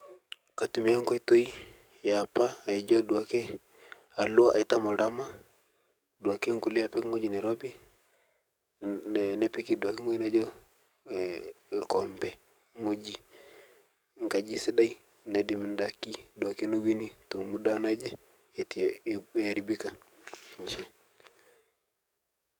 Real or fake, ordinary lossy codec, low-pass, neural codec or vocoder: fake; none; 19.8 kHz; codec, 44.1 kHz, 7.8 kbps, DAC